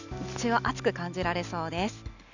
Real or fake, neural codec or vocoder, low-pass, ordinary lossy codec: real; none; 7.2 kHz; none